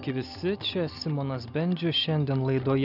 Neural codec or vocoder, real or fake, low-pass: none; real; 5.4 kHz